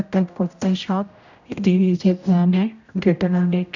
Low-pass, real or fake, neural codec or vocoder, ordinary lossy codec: 7.2 kHz; fake; codec, 16 kHz, 0.5 kbps, X-Codec, HuBERT features, trained on general audio; none